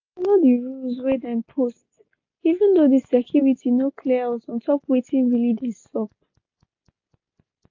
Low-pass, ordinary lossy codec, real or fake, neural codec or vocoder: 7.2 kHz; AAC, 48 kbps; real; none